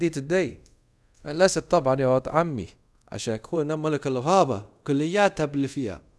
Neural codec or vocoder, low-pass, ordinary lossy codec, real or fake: codec, 24 kHz, 0.5 kbps, DualCodec; none; none; fake